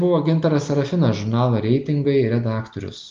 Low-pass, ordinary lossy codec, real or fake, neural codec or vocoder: 7.2 kHz; Opus, 32 kbps; real; none